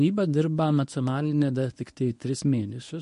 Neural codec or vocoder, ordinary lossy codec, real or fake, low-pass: codec, 24 kHz, 0.9 kbps, WavTokenizer, medium speech release version 1; MP3, 48 kbps; fake; 10.8 kHz